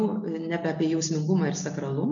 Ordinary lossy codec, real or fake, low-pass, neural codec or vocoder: MP3, 64 kbps; real; 7.2 kHz; none